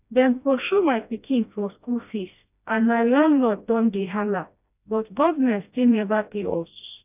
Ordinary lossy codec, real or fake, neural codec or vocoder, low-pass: none; fake; codec, 16 kHz, 1 kbps, FreqCodec, smaller model; 3.6 kHz